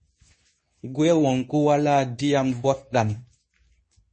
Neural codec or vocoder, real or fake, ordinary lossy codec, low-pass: codec, 24 kHz, 0.9 kbps, WavTokenizer, medium speech release version 2; fake; MP3, 32 kbps; 9.9 kHz